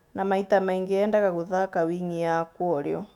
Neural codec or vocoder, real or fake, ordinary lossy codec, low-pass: autoencoder, 48 kHz, 128 numbers a frame, DAC-VAE, trained on Japanese speech; fake; none; 19.8 kHz